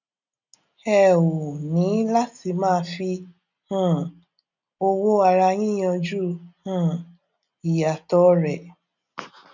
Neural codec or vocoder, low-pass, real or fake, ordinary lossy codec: none; 7.2 kHz; real; none